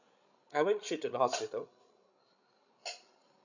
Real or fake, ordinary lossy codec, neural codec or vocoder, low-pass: fake; none; codec, 16 kHz, 16 kbps, FreqCodec, larger model; 7.2 kHz